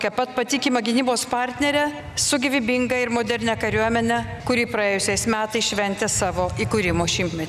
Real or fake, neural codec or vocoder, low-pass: real; none; 14.4 kHz